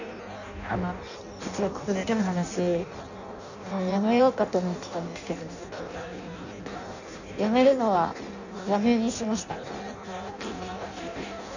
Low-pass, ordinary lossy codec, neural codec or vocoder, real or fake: 7.2 kHz; none; codec, 16 kHz in and 24 kHz out, 0.6 kbps, FireRedTTS-2 codec; fake